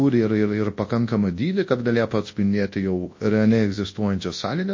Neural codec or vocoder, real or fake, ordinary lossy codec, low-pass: codec, 24 kHz, 0.9 kbps, WavTokenizer, large speech release; fake; MP3, 32 kbps; 7.2 kHz